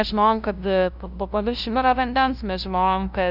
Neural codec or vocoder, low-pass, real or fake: codec, 16 kHz, 0.5 kbps, FunCodec, trained on LibriTTS, 25 frames a second; 5.4 kHz; fake